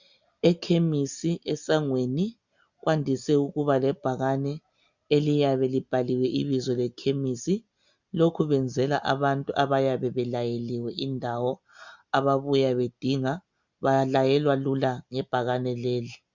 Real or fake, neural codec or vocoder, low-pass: real; none; 7.2 kHz